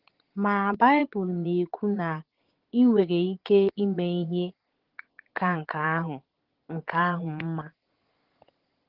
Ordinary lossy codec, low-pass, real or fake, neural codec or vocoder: Opus, 16 kbps; 5.4 kHz; fake; vocoder, 22.05 kHz, 80 mel bands, Vocos